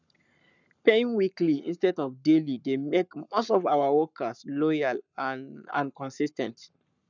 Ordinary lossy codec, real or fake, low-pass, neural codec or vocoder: none; fake; 7.2 kHz; codec, 44.1 kHz, 7.8 kbps, Pupu-Codec